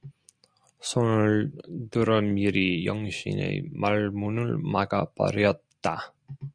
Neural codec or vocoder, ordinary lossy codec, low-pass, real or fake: none; Opus, 64 kbps; 9.9 kHz; real